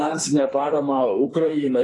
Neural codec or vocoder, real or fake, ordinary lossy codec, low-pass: autoencoder, 48 kHz, 32 numbers a frame, DAC-VAE, trained on Japanese speech; fake; AAC, 32 kbps; 10.8 kHz